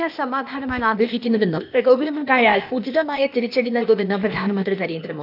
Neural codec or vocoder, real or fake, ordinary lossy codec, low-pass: codec, 16 kHz, 0.8 kbps, ZipCodec; fake; none; 5.4 kHz